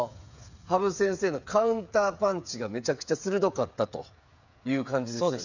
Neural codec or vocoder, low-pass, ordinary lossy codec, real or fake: codec, 16 kHz, 8 kbps, FreqCodec, smaller model; 7.2 kHz; none; fake